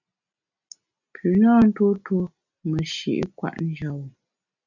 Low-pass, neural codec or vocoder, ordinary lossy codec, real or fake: 7.2 kHz; none; MP3, 64 kbps; real